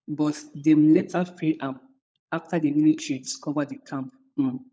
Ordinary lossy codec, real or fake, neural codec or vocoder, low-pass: none; fake; codec, 16 kHz, 16 kbps, FunCodec, trained on LibriTTS, 50 frames a second; none